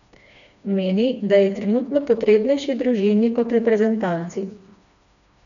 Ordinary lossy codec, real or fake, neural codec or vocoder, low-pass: none; fake; codec, 16 kHz, 2 kbps, FreqCodec, smaller model; 7.2 kHz